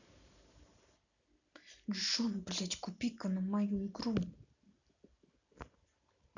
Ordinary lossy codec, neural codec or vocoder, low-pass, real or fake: none; none; 7.2 kHz; real